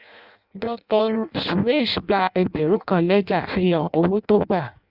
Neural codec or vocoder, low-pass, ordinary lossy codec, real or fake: codec, 16 kHz in and 24 kHz out, 0.6 kbps, FireRedTTS-2 codec; 5.4 kHz; Opus, 64 kbps; fake